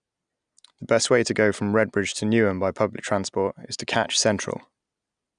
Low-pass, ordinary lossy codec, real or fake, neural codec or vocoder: 9.9 kHz; none; real; none